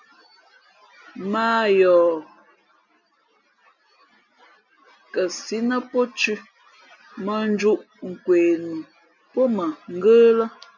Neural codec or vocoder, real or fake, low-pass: none; real; 7.2 kHz